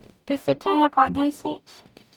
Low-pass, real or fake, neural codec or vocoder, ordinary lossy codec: 19.8 kHz; fake; codec, 44.1 kHz, 0.9 kbps, DAC; none